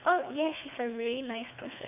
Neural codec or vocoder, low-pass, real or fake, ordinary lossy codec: codec, 24 kHz, 3 kbps, HILCodec; 3.6 kHz; fake; none